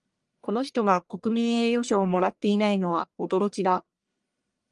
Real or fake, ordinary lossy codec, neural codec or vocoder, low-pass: fake; Opus, 32 kbps; codec, 44.1 kHz, 1.7 kbps, Pupu-Codec; 10.8 kHz